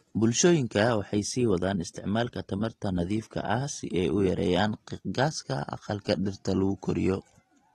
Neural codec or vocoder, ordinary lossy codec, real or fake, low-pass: none; AAC, 32 kbps; real; 19.8 kHz